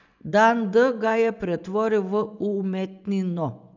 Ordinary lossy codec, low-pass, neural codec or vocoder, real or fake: none; 7.2 kHz; vocoder, 24 kHz, 100 mel bands, Vocos; fake